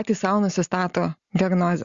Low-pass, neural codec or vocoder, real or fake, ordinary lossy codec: 7.2 kHz; none; real; Opus, 64 kbps